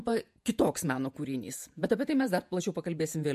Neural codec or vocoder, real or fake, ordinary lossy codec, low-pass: vocoder, 48 kHz, 128 mel bands, Vocos; fake; MP3, 64 kbps; 14.4 kHz